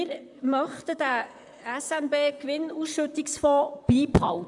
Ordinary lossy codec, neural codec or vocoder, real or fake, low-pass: none; vocoder, 44.1 kHz, 128 mel bands, Pupu-Vocoder; fake; 10.8 kHz